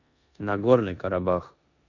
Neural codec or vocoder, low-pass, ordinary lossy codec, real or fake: codec, 16 kHz in and 24 kHz out, 0.9 kbps, LongCat-Audio-Codec, four codebook decoder; 7.2 kHz; none; fake